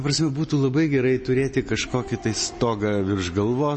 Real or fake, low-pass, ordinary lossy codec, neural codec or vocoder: real; 10.8 kHz; MP3, 32 kbps; none